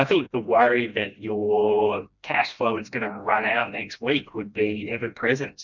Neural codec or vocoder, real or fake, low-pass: codec, 16 kHz, 1 kbps, FreqCodec, smaller model; fake; 7.2 kHz